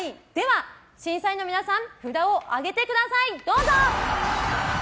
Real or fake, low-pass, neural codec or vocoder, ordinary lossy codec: real; none; none; none